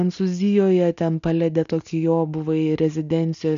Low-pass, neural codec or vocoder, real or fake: 7.2 kHz; none; real